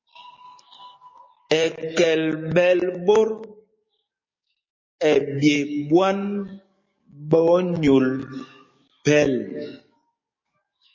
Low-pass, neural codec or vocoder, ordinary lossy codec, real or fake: 7.2 kHz; codec, 44.1 kHz, 7.8 kbps, DAC; MP3, 32 kbps; fake